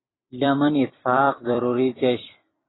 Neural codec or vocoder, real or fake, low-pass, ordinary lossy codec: vocoder, 44.1 kHz, 128 mel bands every 256 samples, BigVGAN v2; fake; 7.2 kHz; AAC, 16 kbps